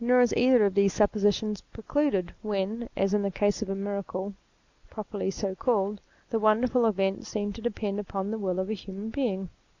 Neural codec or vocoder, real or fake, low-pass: none; real; 7.2 kHz